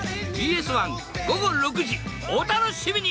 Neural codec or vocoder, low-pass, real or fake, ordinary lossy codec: none; none; real; none